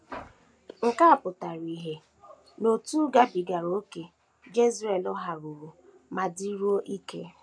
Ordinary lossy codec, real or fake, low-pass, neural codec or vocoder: none; real; none; none